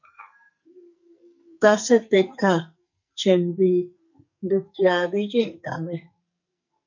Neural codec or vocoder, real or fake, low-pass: codec, 44.1 kHz, 2.6 kbps, SNAC; fake; 7.2 kHz